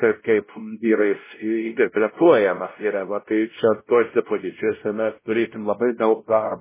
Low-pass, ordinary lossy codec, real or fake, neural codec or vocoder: 3.6 kHz; MP3, 16 kbps; fake; codec, 16 kHz, 0.5 kbps, X-Codec, WavLM features, trained on Multilingual LibriSpeech